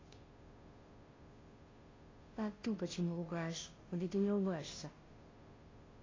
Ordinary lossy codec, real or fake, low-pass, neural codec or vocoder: AAC, 32 kbps; fake; 7.2 kHz; codec, 16 kHz, 0.5 kbps, FunCodec, trained on Chinese and English, 25 frames a second